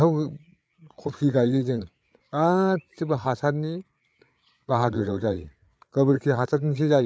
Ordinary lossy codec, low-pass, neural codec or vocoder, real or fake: none; none; codec, 16 kHz, 8 kbps, FreqCodec, larger model; fake